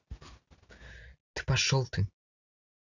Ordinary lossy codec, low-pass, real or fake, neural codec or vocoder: none; 7.2 kHz; real; none